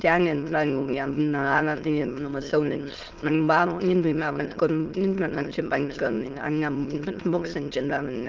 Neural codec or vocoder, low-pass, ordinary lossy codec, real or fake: autoencoder, 22.05 kHz, a latent of 192 numbers a frame, VITS, trained on many speakers; 7.2 kHz; Opus, 16 kbps; fake